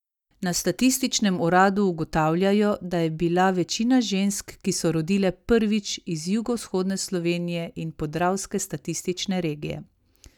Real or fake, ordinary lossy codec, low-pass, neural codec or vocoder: real; none; 19.8 kHz; none